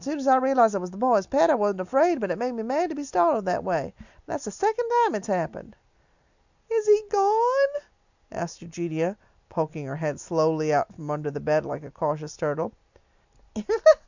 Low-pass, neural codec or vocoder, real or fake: 7.2 kHz; none; real